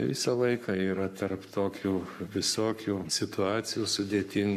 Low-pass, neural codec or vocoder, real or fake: 14.4 kHz; codec, 44.1 kHz, 7.8 kbps, Pupu-Codec; fake